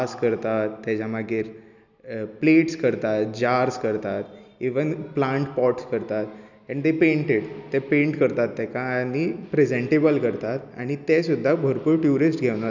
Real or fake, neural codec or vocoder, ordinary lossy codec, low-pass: real; none; none; 7.2 kHz